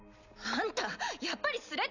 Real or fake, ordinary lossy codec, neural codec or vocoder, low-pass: real; none; none; 7.2 kHz